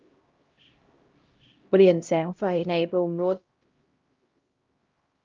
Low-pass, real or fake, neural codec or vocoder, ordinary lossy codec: 7.2 kHz; fake; codec, 16 kHz, 0.5 kbps, X-Codec, HuBERT features, trained on LibriSpeech; Opus, 32 kbps